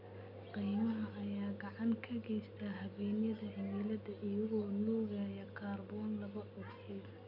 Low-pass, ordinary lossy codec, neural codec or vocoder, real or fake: 5.4 kHz; none; none; real